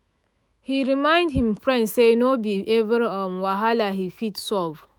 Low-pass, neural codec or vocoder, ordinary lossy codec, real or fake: none; autoencoder, 48 kHz, 128 numbers a frame, DAC-VAE, trained on Japanese speech; none; fake